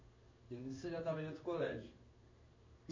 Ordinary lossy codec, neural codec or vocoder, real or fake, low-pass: MP3, 32 kbps; codec, 16 kHz in and 24 kHz out, 1 kbps, XY-Tokenizer; fake; 7.2 kHz